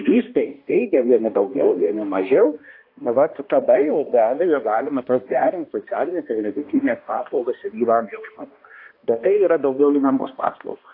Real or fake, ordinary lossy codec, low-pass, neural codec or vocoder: fake; AAC, 32 kbps; 5.4 kHz; codec, 16 kHz, 1 kbps, X-Codec, HuBERT features, trained on balanced general audio